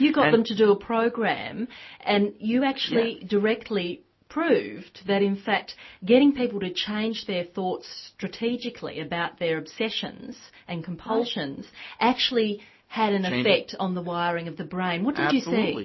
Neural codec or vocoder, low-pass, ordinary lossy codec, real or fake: none; 7.2 kHz; MP3, 24 kbps; real